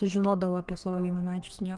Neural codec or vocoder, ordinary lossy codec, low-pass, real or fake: codec, 32 kHz, 1.9 kbps, SNAC; Opus, 24 kbps; 10.8 kHz; fake